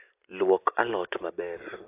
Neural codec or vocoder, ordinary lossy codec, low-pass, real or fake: none; AAC, 32 kbps; 3.6 kHz; real